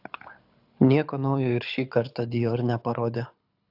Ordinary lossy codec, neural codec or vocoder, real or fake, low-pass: AAC, 48 kbps; codec, 24 kHz, 6 kbps, HILCodec; fake; 5.4 kHz